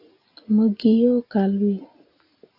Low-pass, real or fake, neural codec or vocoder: 5.4 kHz; real; none